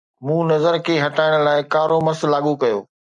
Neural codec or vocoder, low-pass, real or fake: none; 10.8 kHz; real